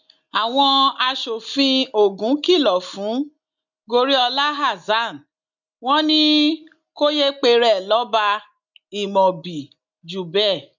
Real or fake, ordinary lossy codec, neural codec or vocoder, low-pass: real; none; none; 7.2 kHz